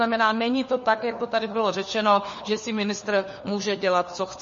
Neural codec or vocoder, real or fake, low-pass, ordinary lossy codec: codec, 16 kHz, 4 kbps, FunCodec, trained on LibriTTS, 50 frames a second; fake; 7.2 kHz; MP3, 32 kbps